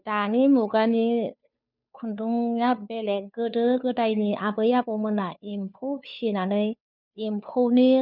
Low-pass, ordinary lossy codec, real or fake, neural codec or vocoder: 5.4 kHz; none; fake; codec, 16 kHz, 2 kbps, FunCodec, trained on Chinese and English, 25 frames a second